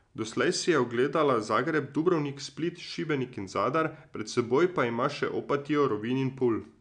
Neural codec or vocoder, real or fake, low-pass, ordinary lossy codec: none; real; 9.9 kHz; none